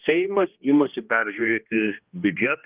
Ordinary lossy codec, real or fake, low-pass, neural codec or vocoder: Opus, 32 kbps; fake; 3.6 kHz; codec, 16 kHz, 1 kbps, X-Codec, HuBERT features, trained on general audio